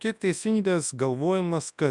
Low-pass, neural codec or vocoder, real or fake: 10.8 kHz; codec, 24 kHz, 0.9 kbps, WavTokenizer, large speech release; fake